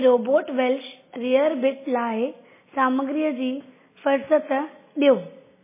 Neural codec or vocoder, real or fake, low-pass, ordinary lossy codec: none; real; 3.6 kHz; MP3, 16 kbps